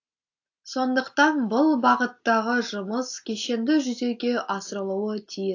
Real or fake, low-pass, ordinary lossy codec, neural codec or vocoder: real; 7.2 kHz; AAC, 48 kbps; none